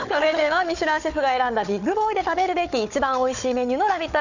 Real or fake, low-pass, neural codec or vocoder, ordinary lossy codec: fake; 7.2 kHz; codec, 16 kHz, 4 kbps, FunCodec, trained on Chinese and English, 50 frames a second; none